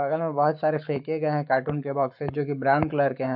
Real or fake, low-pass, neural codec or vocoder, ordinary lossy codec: fake; 5.4 kHz; codec, 44.1 kHz, 7.8 kbps, Pupu-Codec; MP3, 48 kbps